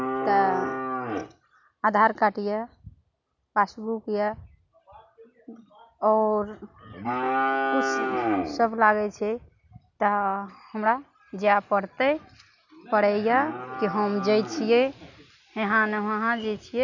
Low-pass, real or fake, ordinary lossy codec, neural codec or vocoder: 7.2 kHz; real; none; none